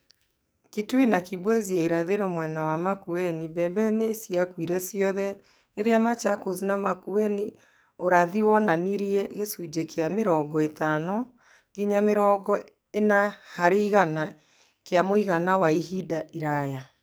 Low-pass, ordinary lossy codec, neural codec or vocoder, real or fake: none; none; codec, 44.1 kHz, 2.6 kbps, SNAC; fake